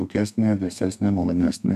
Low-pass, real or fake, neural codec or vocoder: 14.4 kHz; fake; codec, 32 kHz, 1.9 kbps, SNAC